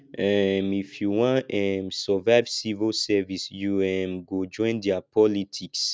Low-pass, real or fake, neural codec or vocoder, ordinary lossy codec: none; real; none; none